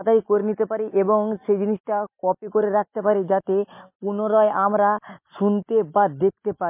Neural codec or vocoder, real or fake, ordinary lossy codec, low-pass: none; real; MP3, 24 kbps; 3.6 kHz